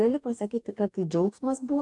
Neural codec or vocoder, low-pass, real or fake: codec, 44.1 kHz, 2.6 kbps, DAC; 10.8 kHz; fake